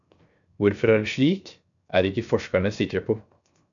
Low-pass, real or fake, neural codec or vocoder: 7.2 kHz; fake; codec, 16 kHz, 0.7 kbps, FocalCodec